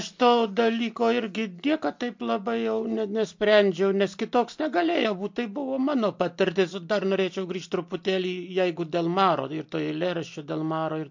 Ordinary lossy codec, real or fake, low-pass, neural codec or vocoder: MP3, 48 kbps; real; 7.2 kHz; none